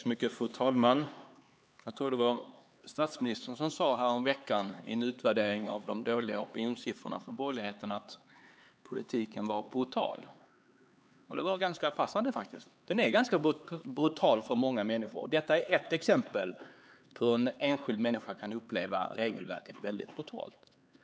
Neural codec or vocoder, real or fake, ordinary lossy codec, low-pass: codec, 16 kHz, 4 kbps, X-Codec, HuBERT features, trained on LibriSpeech; fake; none; none